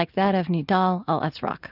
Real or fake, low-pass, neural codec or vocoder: real; 5.4 kHz; none